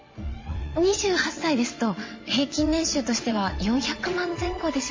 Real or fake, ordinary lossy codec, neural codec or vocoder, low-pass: fake; MP3, 32 kbps; vocoder, 22.05 kHz, 80 mel bands, WaveNeXt; 7.2 kHz